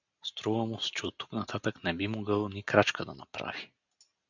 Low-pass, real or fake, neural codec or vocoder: 7.2 kHz; real; none